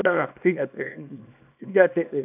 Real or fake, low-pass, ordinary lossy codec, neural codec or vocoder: fake; 3.6 kHz; none; codec, 24 kHz, 0.9 kbps, WavTokenizer, small release